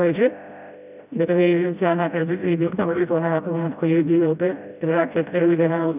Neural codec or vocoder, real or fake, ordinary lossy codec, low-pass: codec, 16 kHz, 0.5 kbps, FreqCodec, smaller model; fake; none; 3.6 kHz